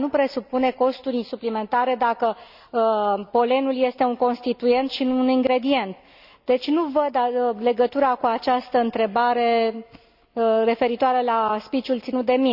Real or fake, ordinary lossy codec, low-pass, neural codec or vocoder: real; none; 5.4 kHz; none